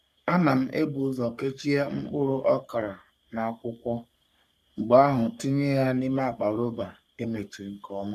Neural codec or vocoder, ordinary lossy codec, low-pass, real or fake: codec, 44.1 kHz, 3.4 kbps, Pupu-Codec; none; 14.4 kHz; fake